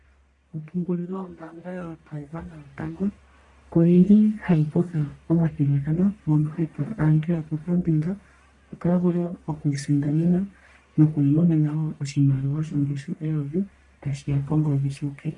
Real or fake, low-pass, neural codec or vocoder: fake; 10.8 kHz; codec, 44.1 kHz, 1.7 kbps, Pupu-Codec